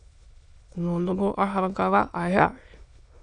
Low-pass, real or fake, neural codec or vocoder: 9.9 kHz; fake; autoencoder, 22.05 kHz, a latent of 192 numbers a frame, VITS, trained on many speakers